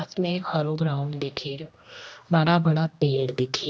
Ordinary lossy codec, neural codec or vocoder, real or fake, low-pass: none; codec, 16 kHz, 1 kbps, X-Codec, HuBERT features, trained on general audio; fake; none